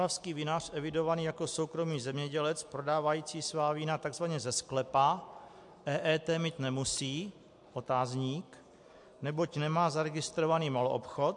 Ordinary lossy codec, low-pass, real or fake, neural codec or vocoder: MP3, 64 kbps; 10.8 kHz; real; none